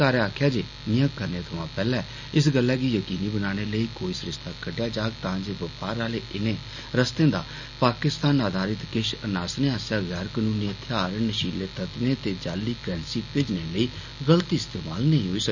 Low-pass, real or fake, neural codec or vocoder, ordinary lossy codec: 7.2 kHz; real; none; none